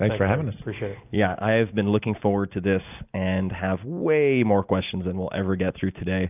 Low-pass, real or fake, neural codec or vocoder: 3.6 kHz; real; none